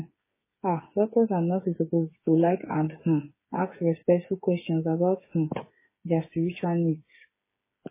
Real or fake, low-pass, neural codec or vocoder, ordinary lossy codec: fake; 3.6 kHz; codec, 16 kHz, 8 kbps, FreqCodec, smaller model; MP3, 16 kbps